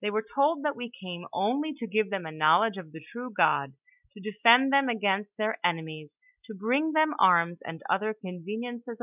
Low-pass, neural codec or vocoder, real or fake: 3.6 kHz; none; real